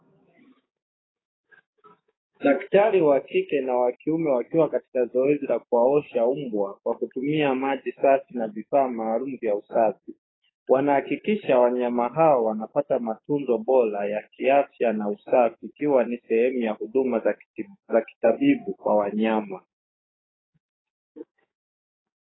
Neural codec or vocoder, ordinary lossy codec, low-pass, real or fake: codec, 16 kHz, 6 kbps, DAC; AAC, 16 kbps; 7.2 kHz; fake